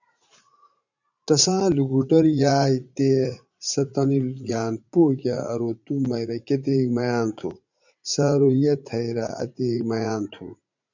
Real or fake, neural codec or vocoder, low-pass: fake; vocoder, 44.1 kHz, 80 mel bands, Vocos; 7.2 kHz